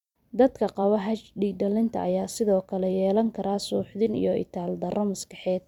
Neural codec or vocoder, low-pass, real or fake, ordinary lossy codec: vocoder, 44.1 kHz, 128 mel bands every 256 samples, BigVGAN v2; 19.8 kHz; fake; none